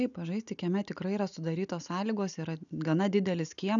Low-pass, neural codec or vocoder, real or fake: 7.2 kHz; none; real